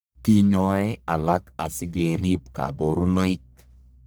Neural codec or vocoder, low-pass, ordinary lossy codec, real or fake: codec, 44.1 kHz, 1.7 kbps, Pupu-Codec; none; none; fake